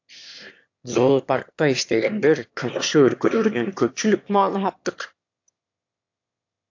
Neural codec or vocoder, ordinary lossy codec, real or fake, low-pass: autoencoder, 22.05 kHz, a latent of 192 numbers a frame, VITS, trained on one speaker; AAC, 48 kbps; fake; 7.2 kHz